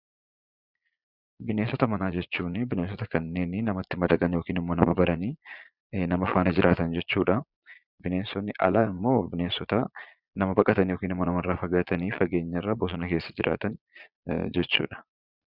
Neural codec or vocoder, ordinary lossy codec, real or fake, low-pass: vocoder, 22.05 kHz, 80 mel bands, Vocos; Opus, 64 kbps; fake; 5.4 kHz